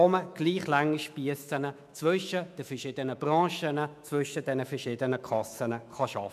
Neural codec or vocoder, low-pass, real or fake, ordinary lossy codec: autoencoder, 48 kHz, 128 numbers a frame, DAC-VAE, trained on Japanese speech; 14.4 kHz; fake; none